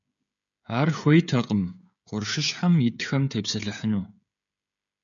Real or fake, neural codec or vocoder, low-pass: fake; codec, 16 kHz, 16 kbps, FreqCodec, smaller model; 7.2 kHz